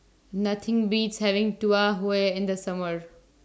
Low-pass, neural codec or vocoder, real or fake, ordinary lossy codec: none; none; real; none